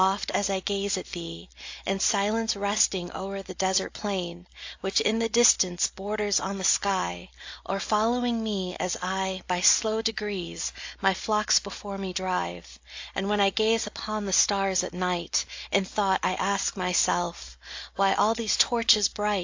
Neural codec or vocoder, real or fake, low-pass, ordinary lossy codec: none; real; 7.2 kHz; AAC, 48 kbps